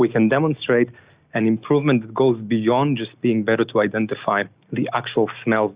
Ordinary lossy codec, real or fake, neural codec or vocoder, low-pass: Opus, 64 kbps; real; none; 3.6 kHz